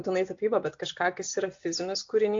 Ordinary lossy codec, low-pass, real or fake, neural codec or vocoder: AAC, 48 kbps; 7.2 kHz; real; none